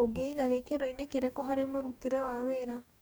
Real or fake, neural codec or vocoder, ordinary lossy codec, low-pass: fake; codec, 44.1 kHz, 2.6 kbps, DAC; none; none